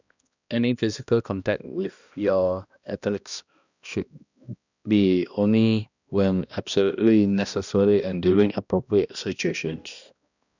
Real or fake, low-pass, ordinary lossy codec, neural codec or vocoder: fake; 7.2 kHz; none; codec, 16 kHz, 1 kbps, X-Codec, HuBERT features, trained on balanced general audio